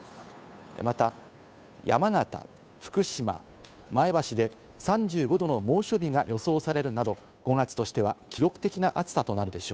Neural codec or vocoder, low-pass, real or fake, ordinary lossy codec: codec, 16 kHz, 2 kbps, FunCodec, trained on Chinese and English, 25 frames a second; none; fake; none